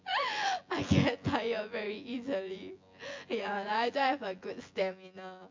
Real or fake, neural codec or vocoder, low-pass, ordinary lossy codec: fake; vocoder, 24 kHz, 100 mel bands, Vocos; 7.2 kHz; MP3, 48 kbps